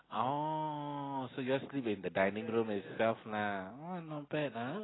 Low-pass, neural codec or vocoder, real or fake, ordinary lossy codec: 7.2 kHz; none; real; AAC, 16 kbps